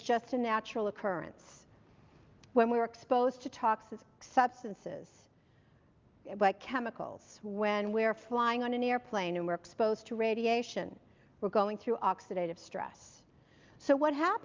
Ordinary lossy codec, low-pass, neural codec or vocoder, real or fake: Opus, 24 kbps; 7.2 kHz; none; real